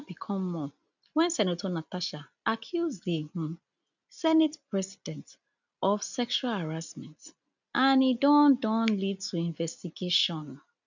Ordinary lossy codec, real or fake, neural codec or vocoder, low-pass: none; real; none; 7.2 kHz